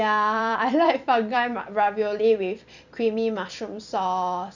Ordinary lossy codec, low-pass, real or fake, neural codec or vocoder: none; 7.2 kHz; fake; vocoder, 44.1 kHz, 128 mel bands every 256 samples, BigVGAN v2